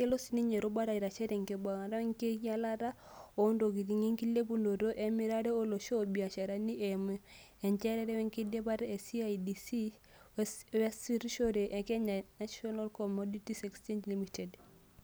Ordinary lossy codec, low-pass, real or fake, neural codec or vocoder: none; none; real; none